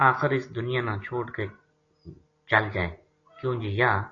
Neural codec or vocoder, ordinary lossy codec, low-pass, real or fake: none; AAC, 32 kbps; 7.2 kHz; real